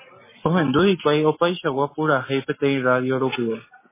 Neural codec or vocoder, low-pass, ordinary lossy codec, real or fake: none; 3.6 kHz; MP3, 16 kbps; real